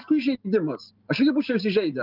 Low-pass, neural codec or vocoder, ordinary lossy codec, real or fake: 5.4 kHz; none; Opus, 24 kbps; real